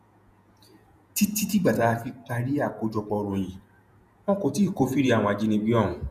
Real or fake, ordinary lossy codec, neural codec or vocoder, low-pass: fake; none; vocoder, 48 kHz, 128 mel bands, Vocos; 14.4 kHz